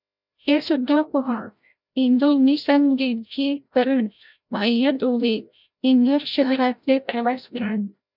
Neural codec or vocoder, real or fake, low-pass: codec, 16 kHz, 0.5 kbps, FreqCodec, larger model; fake; 5.4 kHz